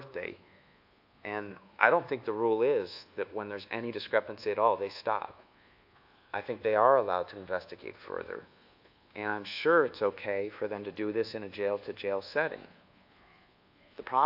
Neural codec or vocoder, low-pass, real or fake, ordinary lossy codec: codec, 24 kHz, 1.2 kbps, DualCodec; 5.4 kHz; fake; AAC, 48 kbps